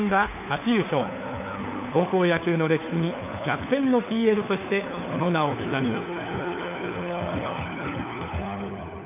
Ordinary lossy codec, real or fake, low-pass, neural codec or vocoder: AAC, 32 kbps; fake; 3.6 kHz; codec, 16 kHz, 4 kbps, FunCodec, trained on LibriTTS, 50 frames a second